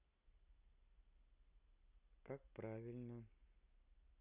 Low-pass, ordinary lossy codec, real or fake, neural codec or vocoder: 3.6 kHz; none; real; none